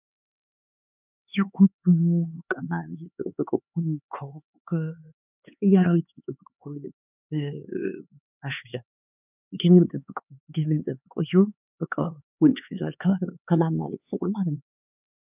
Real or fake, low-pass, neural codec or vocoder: fake; 3.6 kHz; codec, 16 kHz, 4 kbps, X-Codec, HuBERT features, trained on LibriSpeech